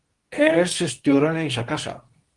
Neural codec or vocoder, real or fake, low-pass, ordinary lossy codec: codec, 24 kHz, 0.9 kbps, WavTokenizer, medium speech release version 2; fake; 10.8 kHz; Opus, 24 kbps